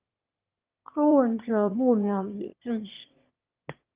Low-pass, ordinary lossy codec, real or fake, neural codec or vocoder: 3.6 kHz; Opus, 32 kbps; fake; autoencoder, 22.05 kHz, a latent of 192 numbers a frame, VITS, trained on one speaker